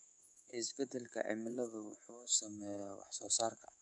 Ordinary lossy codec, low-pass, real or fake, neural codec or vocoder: none; 10.8 kHz; fake; codec, 24 kHz, 3.1 kbps, DualCodec